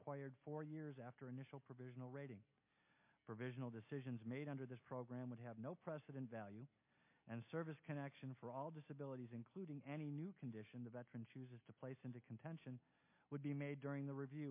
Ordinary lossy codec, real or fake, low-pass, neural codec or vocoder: MP3, 24 kbps; real; 3.6 kHz; none